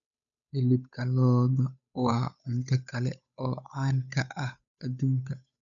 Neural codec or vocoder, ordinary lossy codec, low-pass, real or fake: codec, 16 kHz, 8 kbps, FunCodec, trained on Chinese and English, 25 frames a second; none; 7.2 kHz; fake